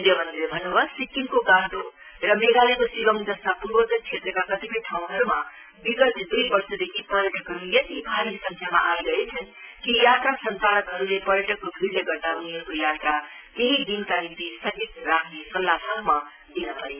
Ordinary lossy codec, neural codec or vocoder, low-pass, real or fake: none; none; 3.6 kHz; real